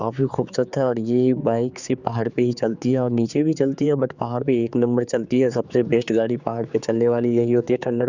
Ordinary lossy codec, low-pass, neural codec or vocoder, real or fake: Opus, 64 kbps; 7.2 kHz; codec, 16 kHz, 4 kbps, X-Codec, HuBERT features, trained on general audio; fake